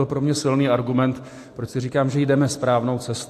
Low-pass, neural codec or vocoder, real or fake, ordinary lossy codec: 14.4 kHz; none; real; AAC, 64 kbps